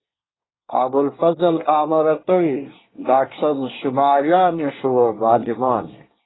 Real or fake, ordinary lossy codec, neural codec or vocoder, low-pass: fake; AAC, 16 kbps; codec, 24 kHz, 1 kbps, SNAC; 7.2 kHz